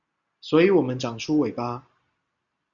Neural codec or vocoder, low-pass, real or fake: none; 7.2 kHz; real